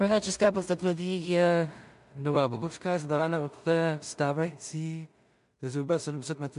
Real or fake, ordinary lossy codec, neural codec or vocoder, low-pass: fake; MP3, 64 kbps; codec, 16 kHz in and 24 kHz out, 0.4 kbps, LongCat-Audio-Codec, two codebook decoder; 10.8 kHz